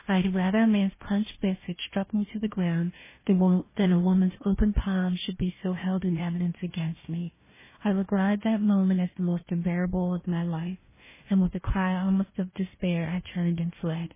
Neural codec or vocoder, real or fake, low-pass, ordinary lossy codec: codec, 16 kHz, 1 kbps, FunCodec, trained on Chinese and English, 50 frames a second; fake; 3.6 kHz; MP3, 16 kbps